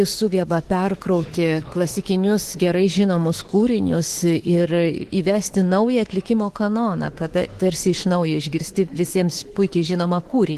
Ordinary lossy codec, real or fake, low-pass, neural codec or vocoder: Opus, 16 kbps; fake; 14.4 kHz; autoencoder, 48 kHz, 32 numbers a frame, DAC-VAE, trained on Japanese speech